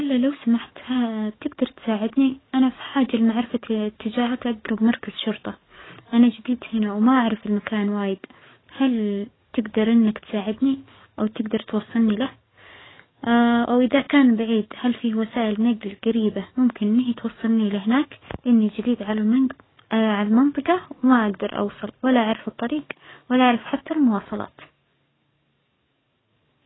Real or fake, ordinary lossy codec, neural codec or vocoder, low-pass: fake; AAC, 16 kbps; codec, 44.1 kHz, 7.8 kbps, DAC; 7.2 kHz